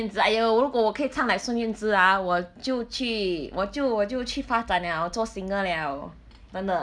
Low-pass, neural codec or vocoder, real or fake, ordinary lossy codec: 9.9 kHz; none; real; none